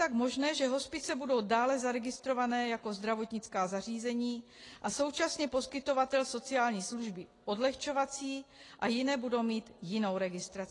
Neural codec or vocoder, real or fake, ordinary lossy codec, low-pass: none; real; AAC, 32 kbps; 10.8 kHz